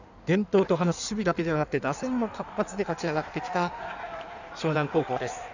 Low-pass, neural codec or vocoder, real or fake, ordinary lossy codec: 7.2 kHz; codec, 16 kHz in and 24 kHz out, 1.1 kbps, FireRedTTS-2 codec; fake; none